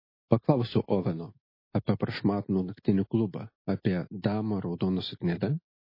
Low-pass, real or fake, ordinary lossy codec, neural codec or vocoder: 5.4 kHz; real; MP3, 24 kbps; none